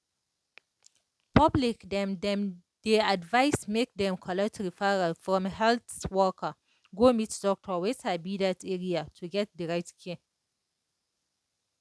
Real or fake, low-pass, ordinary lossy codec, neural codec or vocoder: real; none; none; none